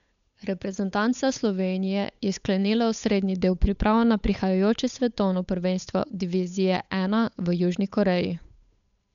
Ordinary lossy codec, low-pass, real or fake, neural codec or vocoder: MP3, 96 kbps; 7.2 kHz; fake; codec, 16 kHz, 8 kbps, FunCodec, trained on Chinese and English, 25 frames a second